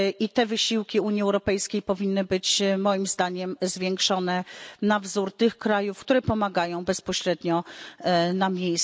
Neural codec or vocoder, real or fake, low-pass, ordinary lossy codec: none; real; none; none